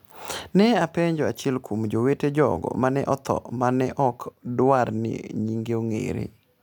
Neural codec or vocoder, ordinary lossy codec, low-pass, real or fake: none; none; none; real